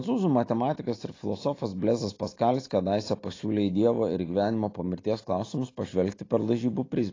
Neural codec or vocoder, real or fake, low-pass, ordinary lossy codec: none; real; 7.2 kHz; AAC, 32 kbps